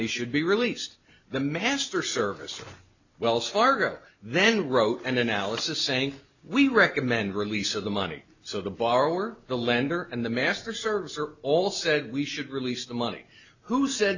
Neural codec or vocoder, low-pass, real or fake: none; 7.2 kHz; real